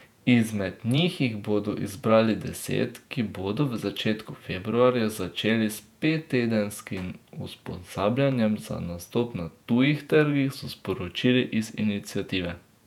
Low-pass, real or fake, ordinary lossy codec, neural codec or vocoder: 19.8 kHz; fake; none; vocoder, 48 kHz, 128 mel bands, Vocos